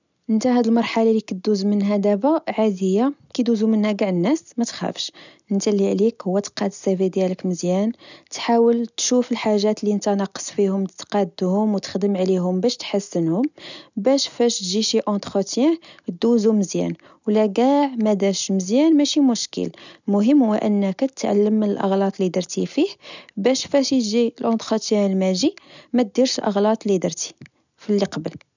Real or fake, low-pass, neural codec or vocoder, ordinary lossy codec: real; 7.2 kHz; none; none